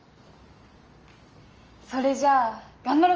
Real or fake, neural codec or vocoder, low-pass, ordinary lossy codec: real; none; 7.2 kHz; Opus, 24 kbps